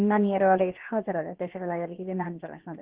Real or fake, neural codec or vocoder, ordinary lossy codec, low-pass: fake; codec, 16 kHz, about 1 kbps, DyCAST, with the encoder's durations; Opus, 16 kbps; 3.6 kHz